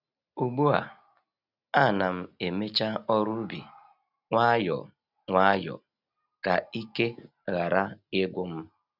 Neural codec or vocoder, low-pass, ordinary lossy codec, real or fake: none; 5.4 kHz; none; real